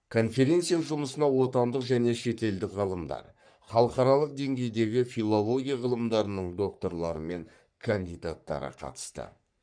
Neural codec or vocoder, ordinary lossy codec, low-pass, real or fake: codec, 44.1 kHz, 3.4 kbps, Pupu-Codec; none; 9.9 kHz; fake